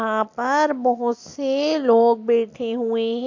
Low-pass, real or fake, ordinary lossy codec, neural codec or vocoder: 7.2 kHz; real; AAC, 48 kbps; none